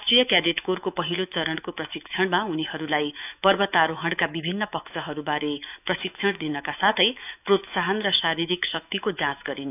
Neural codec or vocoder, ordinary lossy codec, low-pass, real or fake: autoencoder, 48 kHz, 128 numbers a frame, DAC-VAE, trained on Japanese speech; none; 3.6 kHz; fake